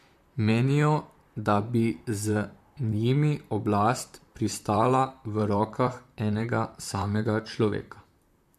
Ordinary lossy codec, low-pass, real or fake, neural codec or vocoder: MP3, 64 kbps; 14.4 kHz; fake; vocoder, 44.1 kHz, 128 mel bands, Pupu-Vocoder